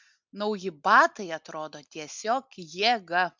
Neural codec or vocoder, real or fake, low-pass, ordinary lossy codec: none; real; 7.2 kHz; MP3, 64 kbps